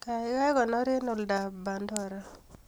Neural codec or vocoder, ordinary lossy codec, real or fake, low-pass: none; none; real; none